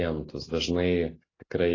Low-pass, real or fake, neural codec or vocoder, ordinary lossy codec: 7.2 kHz; real; none; AAC, 32 kbps